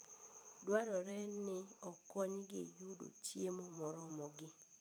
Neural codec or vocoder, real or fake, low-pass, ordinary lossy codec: vocoder, 44.1 kHz, 128 mel bands every 512 samples, BigVGAN v2; fake; none; none